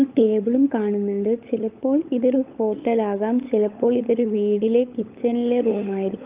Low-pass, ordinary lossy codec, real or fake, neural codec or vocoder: 3.6 kHz; Opus, 24 kbps; fake; codec, 16 kHz, 16 kbps, FunCodec, trained on LibriTTS, 50 frames a second